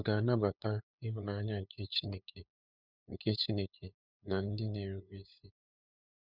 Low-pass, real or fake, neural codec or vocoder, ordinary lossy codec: 5.4 kHz; fake; codec, 16 kHz, 8 kbps, FunCodec, trained on LibriTTS, 25 frames a second; none